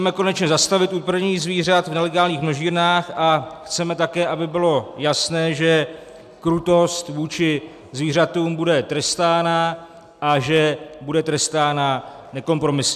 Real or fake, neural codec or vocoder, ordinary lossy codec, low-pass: real; none; AAC, 96 kbps; 14.4 kHz